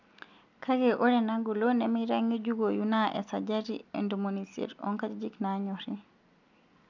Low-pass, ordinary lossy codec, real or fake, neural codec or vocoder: 7.2 kHz; none; real; none